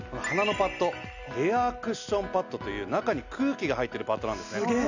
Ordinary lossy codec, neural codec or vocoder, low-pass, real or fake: none; none; 7.2 kHz; real